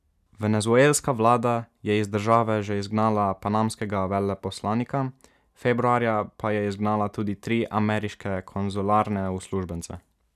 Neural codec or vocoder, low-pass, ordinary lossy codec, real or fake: none; 14.4 kHz; none; real